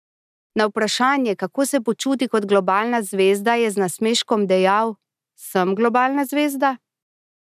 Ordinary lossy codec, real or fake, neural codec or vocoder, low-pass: none; real; none; 14.4 kHz